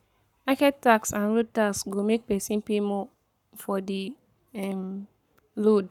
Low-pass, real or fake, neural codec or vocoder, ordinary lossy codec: 19.8 kHz; fake; codec, 44.1 kHz, 7.8 kbps, Pupu-Codec; none